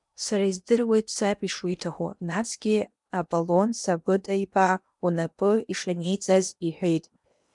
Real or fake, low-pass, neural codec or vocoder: fake; 10.8 kHz; codec, 16 kHz in and 24 kHz out, 0.8 kbps, FocalCodec, streaming, 65536 codes